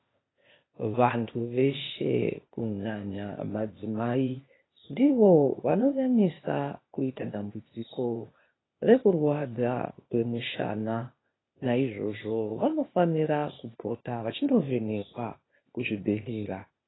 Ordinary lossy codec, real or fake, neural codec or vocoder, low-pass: AAC, 16 kbps; fake; codec, 16 kHz, 0.8 kbps, ZipCodec; 7.2 kHz